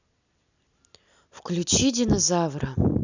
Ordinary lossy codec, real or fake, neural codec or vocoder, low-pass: none; real; none; 7.2 kHz